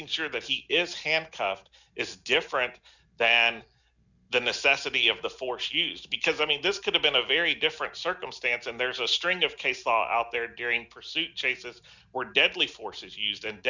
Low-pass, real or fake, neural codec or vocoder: 7.2 kHz; real; none